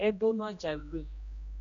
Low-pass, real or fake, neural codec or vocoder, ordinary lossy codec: 7.2 kHz; fake; codec, 16 kHz, 1 kbps, X-Codec, HuBERT features, trained on general audio; MP3, 96 kbps